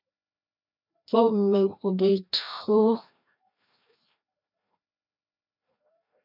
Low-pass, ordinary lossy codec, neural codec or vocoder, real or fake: 5.4 kHz; MP3, 48 kbps; codec, 16 kHz, 1 kbps, FreqCodec, larger model; fake